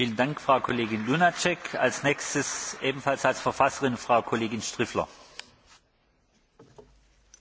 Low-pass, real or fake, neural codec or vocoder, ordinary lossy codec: none; real; none; none